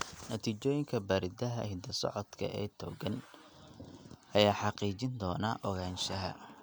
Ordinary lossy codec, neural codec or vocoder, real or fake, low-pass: none; none; real; none